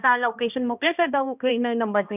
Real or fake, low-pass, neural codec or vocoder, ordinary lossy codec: fake; 3.6 kHz; codec, 16 kHz, 1 kbps, X-Codec, HuBERT features, trained on balanced general audio; none